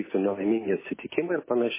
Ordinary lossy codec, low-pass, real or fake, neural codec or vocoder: MP3, 16 kbps; 3.6 kHz; real; none